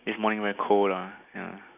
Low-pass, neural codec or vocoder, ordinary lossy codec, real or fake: 3.6 kHz; none; none; real